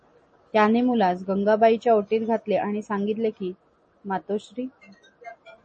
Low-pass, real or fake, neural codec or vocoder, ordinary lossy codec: 10.8 kHz; real; none; MP3, 32 kbps